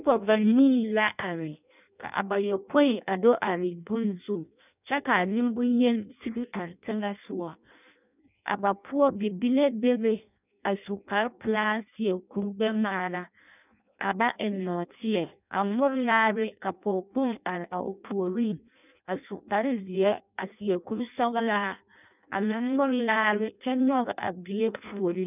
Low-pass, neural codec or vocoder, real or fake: 3.6 kHz; codec, 16 kHz in and 24 kHz out, 0.6 kbps, FireRedTTS-2 codec; fake